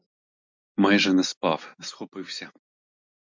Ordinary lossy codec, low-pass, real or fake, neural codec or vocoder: MP3, 64 kbps; 7.2 kHz; real; none